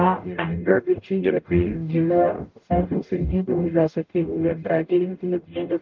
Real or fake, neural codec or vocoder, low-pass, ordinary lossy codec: fake; codec, 44.1 kHz, 0.9 kbps, DAC; 7.2 kHz; Opus, 24 kbps